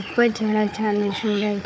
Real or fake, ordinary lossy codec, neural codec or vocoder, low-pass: fake; none; codec, 16 kHz, 4 kbps, FunCodec, trained on Chinese and English, 50 frames a second; none